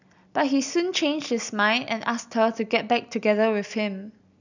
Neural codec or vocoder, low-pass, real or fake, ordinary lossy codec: none; 7.2 kHz; real; none